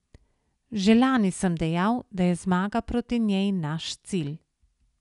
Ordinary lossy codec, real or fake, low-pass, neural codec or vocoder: none; real; 10.8 kHz; none